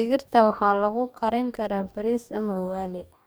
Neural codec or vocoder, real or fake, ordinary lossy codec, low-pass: codec, 44.1 kHz, 2.6 kbps, DAC; fake; none; none